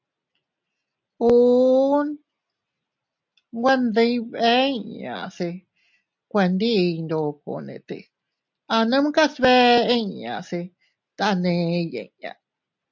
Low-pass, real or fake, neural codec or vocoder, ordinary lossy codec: 7.2 kHz; real; none; MP3, 64 kbps